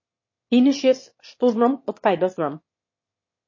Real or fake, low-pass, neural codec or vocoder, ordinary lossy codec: fake; 7.2 kHz; autoencoder, 22.05 kHz, a latent of 192 numbers a frame, VITS, trained on one speaker; MP3, 32 kbps